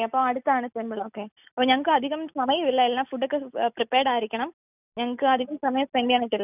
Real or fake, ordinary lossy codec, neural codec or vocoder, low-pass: real; none; none; 3.6 kHz